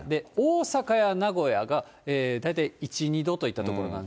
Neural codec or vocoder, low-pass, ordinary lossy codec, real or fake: none; none; none; real